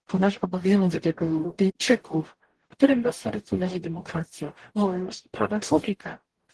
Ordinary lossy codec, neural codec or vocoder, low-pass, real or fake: Opus, 16 kbps; codec, 44.1 kHz, 0.9 kbps, DAC; 10.8 kHz; fake